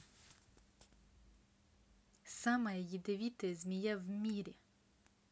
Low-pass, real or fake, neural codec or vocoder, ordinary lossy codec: none; real; none; none